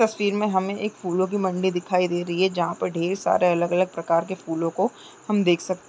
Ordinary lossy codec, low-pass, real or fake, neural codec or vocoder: none; none; real; none